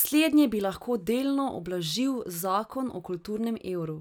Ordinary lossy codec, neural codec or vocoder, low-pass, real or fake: none; none; none; real